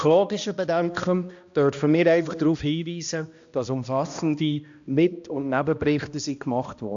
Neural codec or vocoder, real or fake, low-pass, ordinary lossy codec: codec, 16 kHz, 1 kbps, X-Codec, HuBERT features, trained on balanced general audio; fake; 7.2 kHz; AAC, 64 kbps